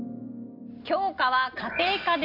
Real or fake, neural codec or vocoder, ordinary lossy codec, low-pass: real; none; none; 5.4 kHz